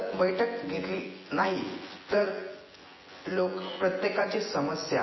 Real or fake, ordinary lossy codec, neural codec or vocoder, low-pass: fake; MP3, 24 kbps; vocoder, 24 kHz, 100 mel bands, Vocos; 7.2 kHz